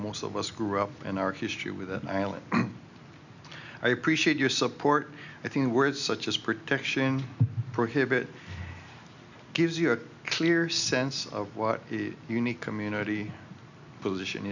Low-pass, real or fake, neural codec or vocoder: 7.2 kHz; real; none